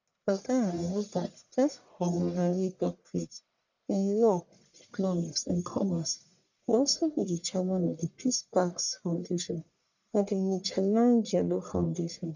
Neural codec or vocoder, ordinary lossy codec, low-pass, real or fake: codec, 44.1 kHz, 1.7 kbps, Pupu-Codec; none; 7.2 kHz; fake